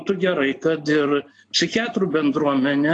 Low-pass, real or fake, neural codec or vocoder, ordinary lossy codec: 9.9 kHz; real; none; AAC, 48 kbps